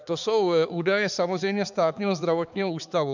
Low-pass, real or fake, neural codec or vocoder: 7.2 kHz; fake; codec, 16 kHz, 4 kbps, X-Codec, HuBERT features, trained on balanced general audio